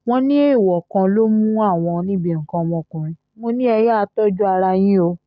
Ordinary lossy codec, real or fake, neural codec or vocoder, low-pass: none; real; none; none